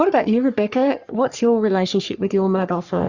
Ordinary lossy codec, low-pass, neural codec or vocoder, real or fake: Opus, 64 kbps; 7.2 kHz; codec, 44.1 kHz, 3.4 kbps, Pupu-Codec; fake